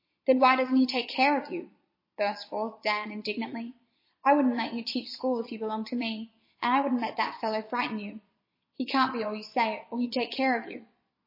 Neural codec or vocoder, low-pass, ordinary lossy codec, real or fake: vocoder, 22.05 kHz, 80 mel bands, Vocos; 5.4 kHz; MP3, 24 kbps; fake